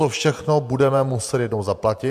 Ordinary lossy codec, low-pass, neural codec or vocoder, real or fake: AAC, 96 kbps; 14.4 kHz; vocoder, 48 kHz, 128 mel bands, Vocos; fake